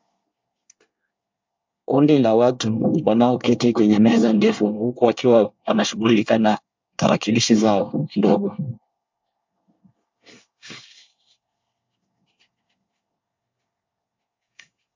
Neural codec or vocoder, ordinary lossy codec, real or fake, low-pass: codec, 24 kHz, 1 kbps, SNAC; MP3, 64 kbps; fake; 7.2 kHz